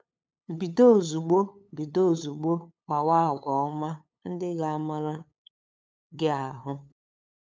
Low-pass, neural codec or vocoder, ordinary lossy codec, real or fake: none; codec, 16 kHz, 8 kbps, FunCodec, trained on LibriTTS, 25 frames a second; none; fake